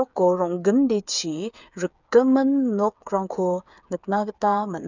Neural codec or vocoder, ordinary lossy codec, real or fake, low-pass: codec, 16 kHz, 8 kbps, FreqCodec, smaller model; none; fake; 7.2 kHz